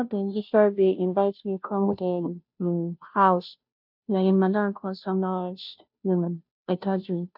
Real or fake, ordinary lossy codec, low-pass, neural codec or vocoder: fake; none; 5.4 kHz; codec, 16 kHz, 0.5 kbps, FunCodec, trained on Chinese and English, 25 frames a second